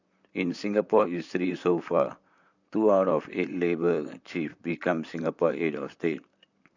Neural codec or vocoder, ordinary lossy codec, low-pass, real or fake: vocoder, 44.1 kHz, 128 mel bands, Pupu-Vocoder; none; 7.2 kHz; fake